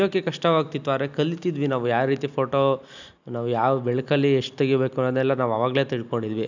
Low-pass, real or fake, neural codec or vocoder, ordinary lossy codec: 7.2 kHz; real; none; none